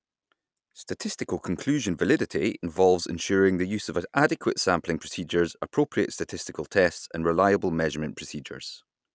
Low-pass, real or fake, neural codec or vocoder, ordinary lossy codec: none; real; none; none